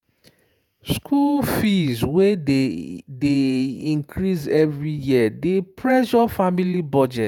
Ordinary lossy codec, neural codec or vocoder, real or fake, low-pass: none; vocoder, 48 kHz, 128 mel bands, Vocos; fake; 19.8 kHz